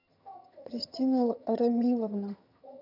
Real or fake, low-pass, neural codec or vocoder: fake; 5.4 kHz; vocoder, 22.05 kHz, 80 mel bands, HiFi-GAN